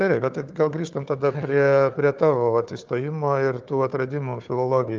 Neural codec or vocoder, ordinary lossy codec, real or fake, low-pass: codec, 16 kHz, 4 kbps, FunCodec, trained on Chinese and English, 50 frames a second; Opus, 24 kbps; fake; 7.2 kHz